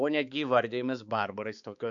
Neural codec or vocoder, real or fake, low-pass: codec, 16 kHz, 4 kbps, X-Codec, HuBERT features, trained on balanced general audio; fake; 7.2 kHz